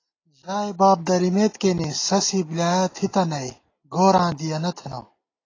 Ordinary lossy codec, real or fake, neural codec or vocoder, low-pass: AAC, 32 kbps; real; none; 7.2 kHz